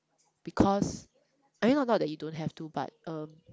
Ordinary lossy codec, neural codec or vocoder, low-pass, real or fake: none; none; none; real